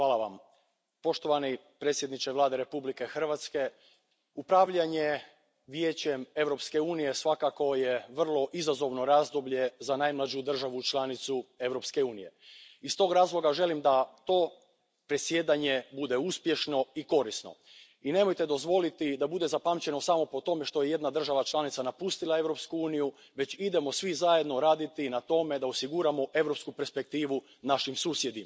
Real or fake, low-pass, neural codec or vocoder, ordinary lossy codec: real; none; none; none